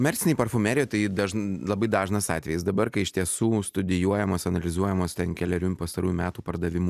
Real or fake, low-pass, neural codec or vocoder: real; 14.4 kHz; none